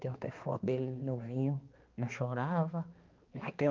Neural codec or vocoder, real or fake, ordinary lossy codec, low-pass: codec, 16 kHz, 2 kbps, X-Codec, HuBERT features, trained on balanced general audio; fake; Opus, 32 kbps; 7.2 kHz